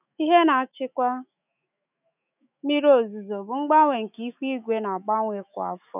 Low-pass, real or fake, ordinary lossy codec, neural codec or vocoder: 3.6 kHz; fake; none; autoencoder, 48 kHz, 128 numbers a frame, DAC-VAE, trained on Japanese speech